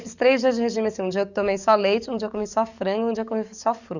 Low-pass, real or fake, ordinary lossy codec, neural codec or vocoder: 7.2 kHz; fake; none; codec, 44.1 kHz, 7.8 kbps, DAC